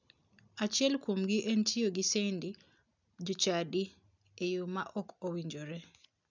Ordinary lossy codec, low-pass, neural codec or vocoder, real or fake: none; 7.2 kHz; none; real